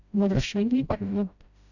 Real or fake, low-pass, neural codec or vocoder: fake; 7.2 kHz; codec, 16 kHz, 0.5 kbps, FreqCodec, smaller model